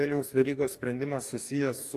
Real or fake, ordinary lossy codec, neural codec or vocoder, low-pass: fake; AAC, 64 kbps; codec, 44.1 kHz, 2.6 kbps, DAC; 14.4 kHz